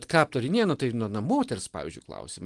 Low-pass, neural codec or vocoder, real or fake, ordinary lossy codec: 10.8 kHz; none; real; Opus, 24 kbps